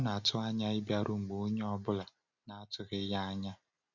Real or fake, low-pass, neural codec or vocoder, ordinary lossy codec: real; 7.2 kHz; none; none